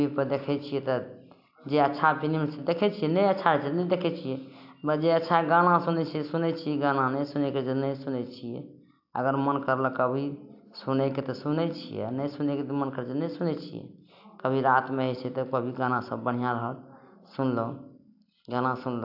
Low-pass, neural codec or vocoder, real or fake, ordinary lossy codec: 5.4 kHz; none; real; AAC, 48 kbps